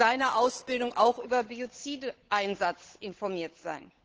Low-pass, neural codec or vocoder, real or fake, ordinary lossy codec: 7.2 kHz; codec, 16 kHz, 8 kbps, FunCodec, trained on Chinese and English, 25 frames a second; fake; Opus, 16 kbps